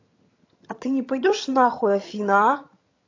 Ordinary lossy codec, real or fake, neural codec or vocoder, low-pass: AAC, 32 kbps; fake; vocoder, 22.05 kHz, 80 mel bands, HiFi-GAN; 7.2 kHz